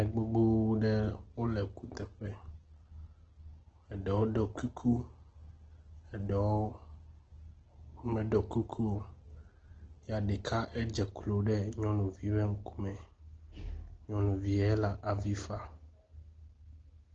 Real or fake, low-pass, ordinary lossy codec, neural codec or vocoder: real; 7.2 kHz; Opus, 16 kbps; none